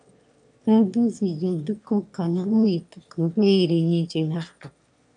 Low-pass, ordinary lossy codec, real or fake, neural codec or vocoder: 9.9 kHz; MP3, 64 kbps; fake; autoencoder, 22.05 kHz, a latent of 192 numbers a frame, VITS, trained on one speaker